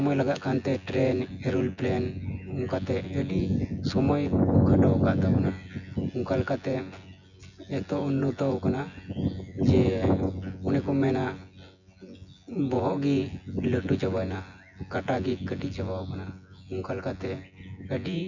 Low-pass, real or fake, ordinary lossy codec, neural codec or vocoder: 7.2 kHz; fake; none; vocoder, 24 kHz, 100 mel bands, Vocos